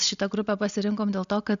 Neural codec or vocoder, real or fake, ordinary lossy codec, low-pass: none; real; Opus, 64 kbps; 7.2 kHz